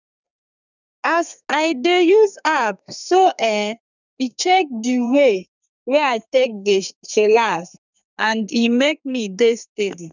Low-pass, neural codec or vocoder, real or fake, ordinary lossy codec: 7.2 kHz; codec, 32 kHz, 1.9 kbps, SNAC; fake; none